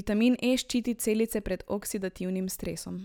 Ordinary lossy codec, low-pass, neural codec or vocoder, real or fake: none; none; none; real